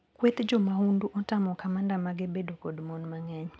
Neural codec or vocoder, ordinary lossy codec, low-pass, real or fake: none; none; none; real